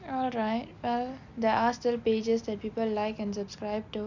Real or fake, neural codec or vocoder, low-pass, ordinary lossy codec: real; none; 7.2 kHz; none